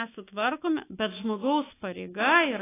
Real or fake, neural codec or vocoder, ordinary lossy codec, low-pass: fake; autoencoder, 48 kHz, 128 numbers a frame, DAC-VAE, trained on Japanese speech; AAC, 16 kbps; 3.6 kHz